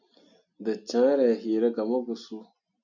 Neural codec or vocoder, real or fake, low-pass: none; real; 7.2 kHz